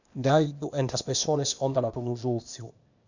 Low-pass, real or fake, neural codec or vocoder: 7.2 kHz; fake; codec, 16 kHz, 0.8 kbps, ZipCodec